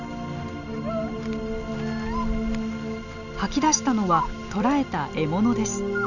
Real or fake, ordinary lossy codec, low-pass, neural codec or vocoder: real; none; 7.2 kHz; none